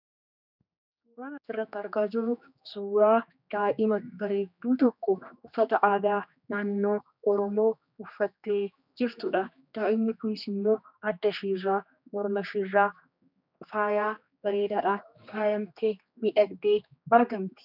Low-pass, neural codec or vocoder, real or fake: 5.4 kHz; codec, 16 kHz, 2 kbps, X-Codec, HuBERT features, trained on general audio; fake